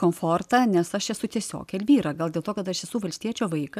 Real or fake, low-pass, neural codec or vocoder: real; 14.4 kHz; none